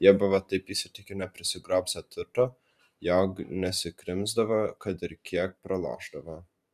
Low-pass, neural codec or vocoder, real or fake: 14.4 kHz; vocoder, 44.1 kHz, 128 mel bands every 512 samples, BigVGAN v2; fake